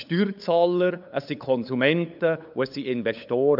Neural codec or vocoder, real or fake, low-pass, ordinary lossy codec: codec, 16 kHz, 8 kbps, FunCodec, trained on LibriTTS, 25 frames a second; fake; 5.4 kHz; none